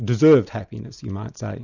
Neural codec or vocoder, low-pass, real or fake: none; 7.2 kHz; real